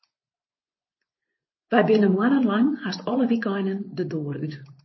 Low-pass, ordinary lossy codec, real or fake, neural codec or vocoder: 7.2 kHz; MP3, 24 kbps; fake; vocoder, 24 kHz, 100 mel bands, Vocos